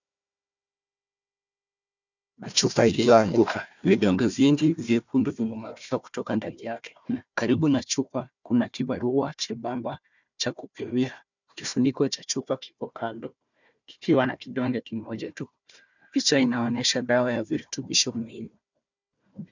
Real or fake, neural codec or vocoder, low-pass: fake; codec, 16 kHz, 1 kbps, FunCodec, trained on Chinese and English, 50 frames a second; 7.2 kHz